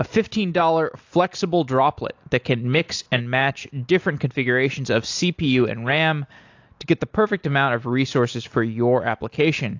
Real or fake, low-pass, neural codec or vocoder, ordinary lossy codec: real; 7.2 kHz; none; AAC, 48 kbps